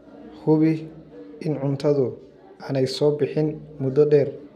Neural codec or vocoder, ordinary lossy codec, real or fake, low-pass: none; none; real; 14.4 kHz